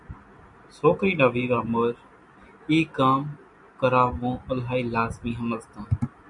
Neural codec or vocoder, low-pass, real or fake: none; 10.8 kHz; real